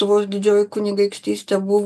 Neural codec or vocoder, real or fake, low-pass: none; real; 14.4 kHz